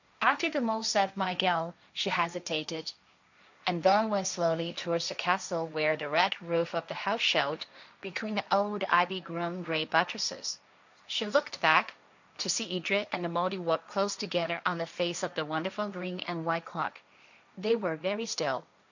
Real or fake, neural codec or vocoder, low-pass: fake; codec, 16 kHz, 1.1 kbps, Voila-Tokenizer; 7.2 kHz